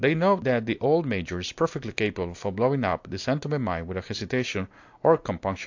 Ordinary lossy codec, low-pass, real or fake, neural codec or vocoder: AAC, 48 kbps; 7.2 kHz; real; none